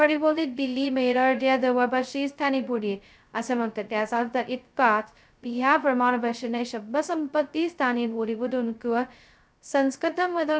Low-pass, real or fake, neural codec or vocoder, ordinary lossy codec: none; fake; codec, 16 kHz, 0.2 kbps, FocalCodec; none